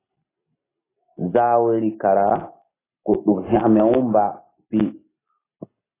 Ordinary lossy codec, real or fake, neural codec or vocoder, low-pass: AAC, 16 kbps; real; none; 3.6 kHz